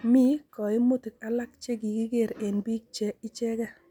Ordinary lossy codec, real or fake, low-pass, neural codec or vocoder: none; real; 19.8 kHz; none